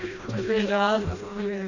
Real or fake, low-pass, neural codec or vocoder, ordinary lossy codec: fake; 7.2 kHz; codec, 16 kHz, 1 kbps, FreqCodec, smaller model; none